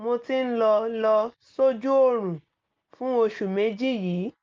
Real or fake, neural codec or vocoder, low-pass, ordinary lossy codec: real; none; 7.2 kHz; Opus, 32 kbps